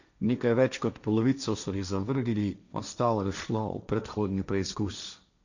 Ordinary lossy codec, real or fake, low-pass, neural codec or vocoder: none; fake; 7.2 kHz; codec, 16 kHz, 1.1 kbps, Voila-Tokenizer